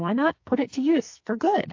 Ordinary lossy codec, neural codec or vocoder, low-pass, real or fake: AAC, 48 kbps; codec, 44.1 kHz, 2.6 kbps, SNAC; 7.2 kHz; fake